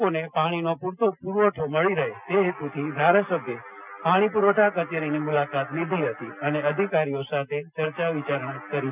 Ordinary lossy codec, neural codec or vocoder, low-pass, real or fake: none; none; 3.6 kHz; real